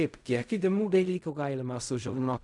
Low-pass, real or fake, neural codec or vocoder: 10.8 kHz; fake; codec, 16 kHz in and 24 kHz out, 0.4 kbps, LongCat-Audio-Codec, fine tuned four codebook decoder